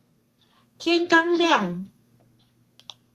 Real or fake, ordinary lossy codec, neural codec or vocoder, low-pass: fake; Opus, 64 kbps; codec, 32 kHz, 1.9 kbps, SNAC; 14.4 kHz